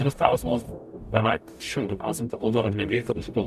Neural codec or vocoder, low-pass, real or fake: codec, 44.1 kHz, 0.9 kbps, DAC; 14.4 kHz; fake